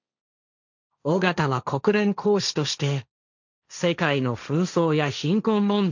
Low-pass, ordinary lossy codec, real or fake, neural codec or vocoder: 7.2 kHz; none; fake; codec, 16 kHz, 1.1 kbps, Voila-Tokenizer